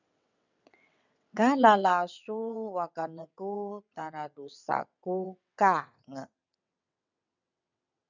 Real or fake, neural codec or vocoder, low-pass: fake; vocoder, 22.05 kHz, 80 mel bands, WaveNeXt; 7.2 kHz